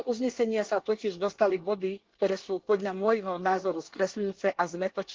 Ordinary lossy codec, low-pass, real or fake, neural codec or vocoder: Opus, 16 kbps; 7.2 kHz; fake; codec, 24 kHz, 1 kbps, SNAC